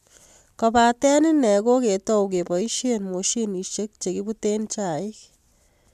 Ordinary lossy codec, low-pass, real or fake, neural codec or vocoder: MP3, 96 kbps; 14.4 kHz; real; none